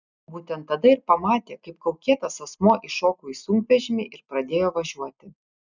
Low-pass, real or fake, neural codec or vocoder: 7.2 kHz; real; none